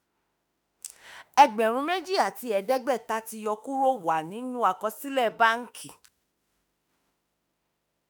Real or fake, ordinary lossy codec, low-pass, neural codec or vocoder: fake; none; none; autoencoder, 48 kHz, 32 numbers a frame, DAC-VAE, trained on Japanese speech